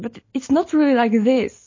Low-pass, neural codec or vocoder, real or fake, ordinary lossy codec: 7.2 kHz; none; real; MP3, 32 kbps